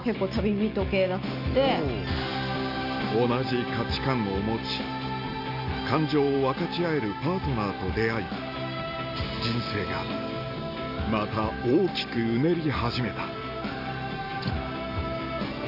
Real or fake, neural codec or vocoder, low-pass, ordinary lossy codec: real; none; 5.4 kHz; none